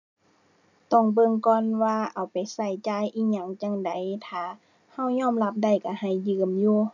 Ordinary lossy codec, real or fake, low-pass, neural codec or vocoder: none; real; 7.2 kHz; none